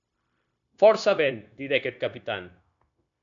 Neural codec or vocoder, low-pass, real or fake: codec, 16 kHz, 0.9 kbps, LongCat-Audio-Codec; 7.2 kHz; fake